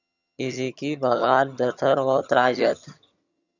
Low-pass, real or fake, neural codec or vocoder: 7.2 kHz; fake; vocoder, 22.05 kHz, 80 mel bands, HiFi-GAN